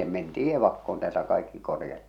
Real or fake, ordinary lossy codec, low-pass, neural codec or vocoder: real; none; 19.8 kHz; none